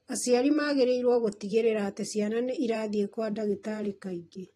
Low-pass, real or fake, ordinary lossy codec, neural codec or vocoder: 19.8 kHz; fake; AAC, 32 kbps; vocoder, 44.1 kHz, 128 mel bands every 512 samples, BigVGAN v2